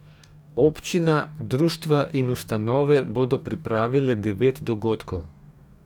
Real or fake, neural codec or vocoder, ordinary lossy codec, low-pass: fake; codec, 44.1 kHz, 2.6 kbps, DAC; none; 19.8 kHz